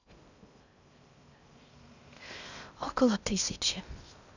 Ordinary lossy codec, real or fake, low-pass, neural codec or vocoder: none; fake; 7.2 kHz; codec, 16 kHz in and 24 kHz out, 0.6 kbps, FocalCodec, streaming, 2048 codes